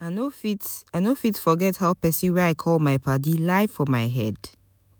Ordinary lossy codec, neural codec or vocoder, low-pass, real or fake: none; autoencoder, 48 kHz, 128 numbers a frame, DAC-VAE, trained on Japanese speech; none; fake